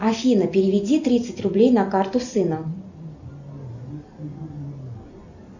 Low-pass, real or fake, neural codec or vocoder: 7.2 kHz; real; none